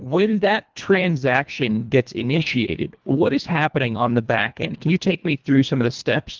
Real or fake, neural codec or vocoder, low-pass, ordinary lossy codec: fake; codec, 24 kHz, 1.5 kbps, HILCodec; 7.2 kHz; Opus, 24 kbps